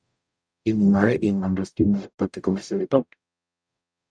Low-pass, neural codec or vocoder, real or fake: 9.9 kHz; codec, 44.1 kHz, 0.9 kbps, DAC; fake